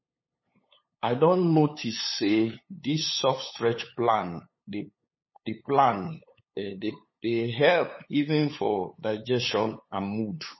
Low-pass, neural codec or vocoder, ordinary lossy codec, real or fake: 7.2 kHz; codec, 16 kHz, 8 kbps, FunCodec, trained on LibriTTS, 25 frames a second; MP3, 24 kbps; fake